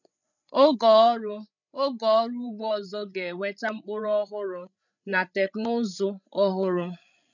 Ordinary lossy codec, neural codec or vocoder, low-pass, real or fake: none; codec, 16 kHz, 8 kbps, FreqCodec, larger model; 7.2 kHz; fake